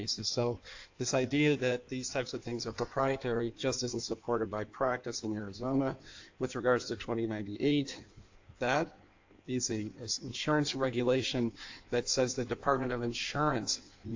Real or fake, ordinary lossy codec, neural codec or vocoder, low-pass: fake; AAC, 48 kbps; codec, 16 kHz in and 24 kHz out, 1.1 kbps, FireRedTTS-2 codec; 7.2 kHz